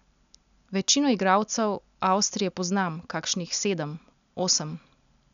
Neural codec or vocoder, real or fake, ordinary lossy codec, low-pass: none; real; none; 7.2 kHz